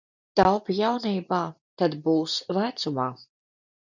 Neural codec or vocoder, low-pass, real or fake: none; 7.2 kHz; real